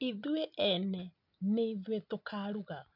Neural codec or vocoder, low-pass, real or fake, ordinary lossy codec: none; 5.4 kHz; real; none